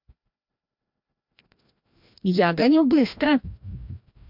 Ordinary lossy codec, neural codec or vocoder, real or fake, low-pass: MP3, 48 kbps; codec, 16 kHz, 1 kbps, FreqCodec, larger model; fake; 5.4 kHz